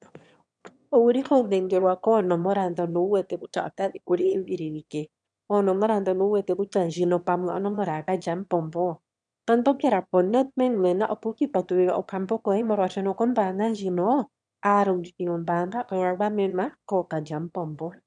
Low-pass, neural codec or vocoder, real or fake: 9.9 kHz; autoencoder, 22.05 kHz, a latent of 192 numbers a frame, VITS, trained on one speaker; fake